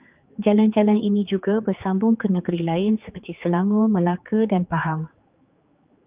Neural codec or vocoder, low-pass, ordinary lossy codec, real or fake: codec, 16 kHz, 4 kbps, X-Codec, HuBERT features, trained on general audio; 3.6 kHz; Opus, 24 kbps; fake